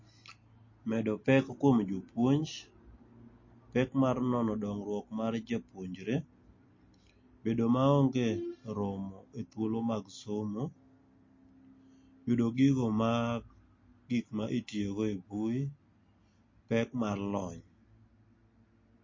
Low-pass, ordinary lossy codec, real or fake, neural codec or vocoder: 7.2 kHz; MP3, 32 kbps; real; none